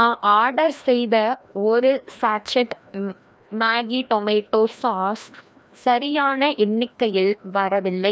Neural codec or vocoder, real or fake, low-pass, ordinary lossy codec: codec, 16 kHz, 1 kbps, FreqCodec, larger model; fake; none; none